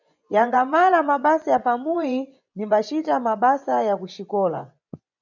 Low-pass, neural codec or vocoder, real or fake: 7.2 kHz; vocoder, 24 kHz, 100 mel bands, Vocos; fake